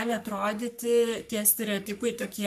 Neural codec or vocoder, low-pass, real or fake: codec, 44.1 kHz, 3.4 kbps, Pupu-Codec; 14.4 kHz; fake